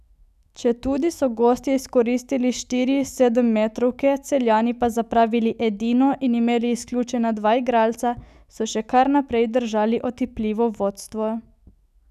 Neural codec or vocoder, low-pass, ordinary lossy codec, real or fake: autoencoder, 48 kHz, 128 numbers a frame, DAC-VAE, trained on Japanese speech; 14.4 kHz; none; fake